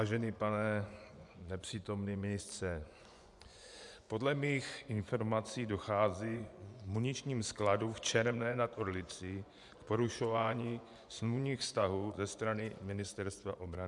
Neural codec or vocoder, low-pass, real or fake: vocoder, 24 kHz, 100 mel bands, Vocos; 10.8 kHz; fake